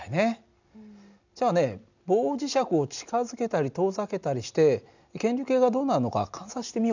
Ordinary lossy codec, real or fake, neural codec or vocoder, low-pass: none; real; none; 7.2 kHz